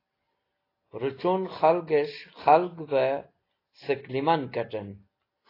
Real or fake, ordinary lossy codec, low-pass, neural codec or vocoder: real; AAC, 24 kbps; 5.4 kHz; none